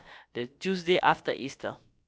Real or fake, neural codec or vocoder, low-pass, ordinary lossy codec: fake; codec, 16 kHz, about 1 kbps, DyCAST, with the encoder's durations; none; none